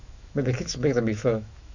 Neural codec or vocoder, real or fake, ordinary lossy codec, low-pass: autoencoder, 48 kHz, 128 numbers a frame, DAC-VAE, trained on Japanese speech; fake; none; 7.2 kHz